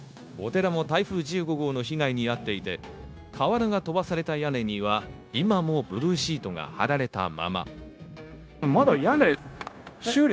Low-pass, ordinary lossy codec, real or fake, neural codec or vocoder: none; none; fake; codec, 16 kHz, 0.9 kbps, LongCat-Audio-Codec